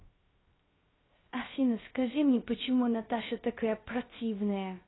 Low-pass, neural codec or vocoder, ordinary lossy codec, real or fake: 7.2 kHz; codec, 16 kHz, 0.3 kbps, FocalCodec; AAC, 16 kbps; fake